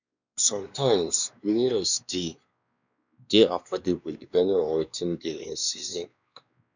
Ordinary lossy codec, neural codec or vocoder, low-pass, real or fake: none; codec, 16 kHz, 2 kbps, X-Codec, WavLM features, trained on Multilingual LibriSpeech; 7.2 kHz; fake